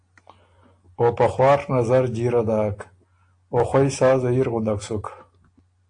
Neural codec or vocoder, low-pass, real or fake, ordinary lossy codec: none; 9.9 kHz; real; AAC, 48 kbps